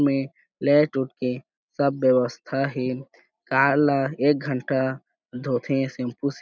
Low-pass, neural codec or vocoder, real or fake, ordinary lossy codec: none; none; real; none